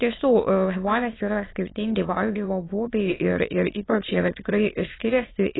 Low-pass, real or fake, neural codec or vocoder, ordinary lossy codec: 7.2 kHz; fake; autoencoder, 22.05 kHz, a latent of 192 numbers a frame, VITS, trained on many speakers; AAC, 16 kbps